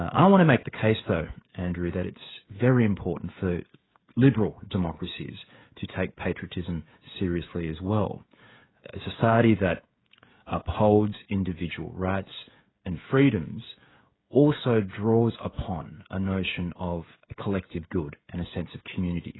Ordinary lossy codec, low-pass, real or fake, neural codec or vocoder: AAC, 16 kbps; 7.2 kHz; fake; codec, 16 kHz, 16 kbps, FreqCodec, smaller model